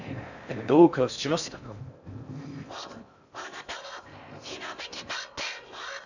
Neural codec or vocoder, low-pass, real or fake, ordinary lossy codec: codec, 16 kHz in and 24 kHz out, 0.6 kbps, FocalCodec, streaming, 4096 codes; 7.2 kHz; fake; none